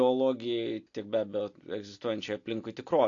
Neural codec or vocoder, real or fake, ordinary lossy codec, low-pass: none; real; AAC, 48 kbps; 7.2 kHz